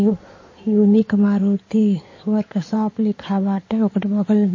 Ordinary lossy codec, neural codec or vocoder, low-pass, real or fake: MP3, 32 kbps; codec, 16 kHz in and 24 kHz out, 2.2 kbps, FireRedTTS-2 codec; 7.2 kHz; fake